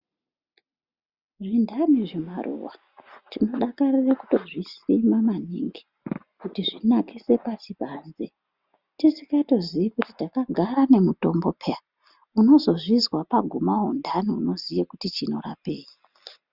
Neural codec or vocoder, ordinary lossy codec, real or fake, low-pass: none; Opus, 64 kbps; real; 5.4 kHz